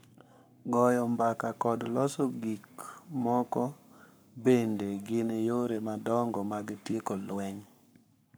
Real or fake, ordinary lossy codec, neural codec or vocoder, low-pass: fake; none; codec, 44.1 kHz, 7.8 kbps, Pupu-Codec; none